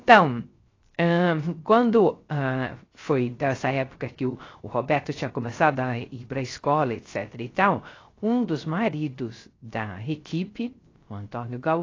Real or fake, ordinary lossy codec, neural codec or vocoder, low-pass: fake; AAC, 32 kbps; codec, 16 kHz, 0.3 kbps, FocalCodec; 7.2 kHz